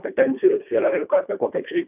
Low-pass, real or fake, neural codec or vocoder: 3.6 kHz; fake; codec, 24 kHz, 1.5 kbps, HILCodec